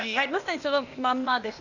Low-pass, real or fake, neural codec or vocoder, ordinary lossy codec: 7.2 kHz; fake; codec, 16 kHz, 0.8 kbps, ZipCodec; none